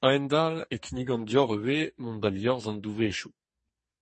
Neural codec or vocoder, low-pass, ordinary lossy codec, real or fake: codec, 44.1 kHz, 2.6 kbps, SNAC; 10.8 kHz; MP3, 32 kbps; fake